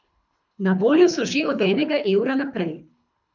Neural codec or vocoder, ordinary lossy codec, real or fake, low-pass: codec, 24 kHz, 3 kbps, HILCodec; none; fake; 7.2 kHz